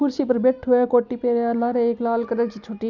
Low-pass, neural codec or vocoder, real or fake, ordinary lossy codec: 7.2 kHz; none; real; none